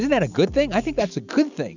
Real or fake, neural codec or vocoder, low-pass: real; none; 7.2 kHz